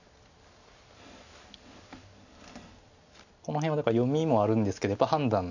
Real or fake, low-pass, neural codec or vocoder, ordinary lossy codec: real; 7.2 kHz; none; none